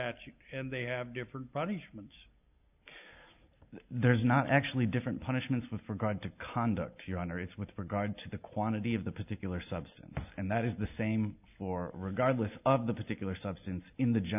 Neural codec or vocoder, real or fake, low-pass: none; real; 3.6 kHz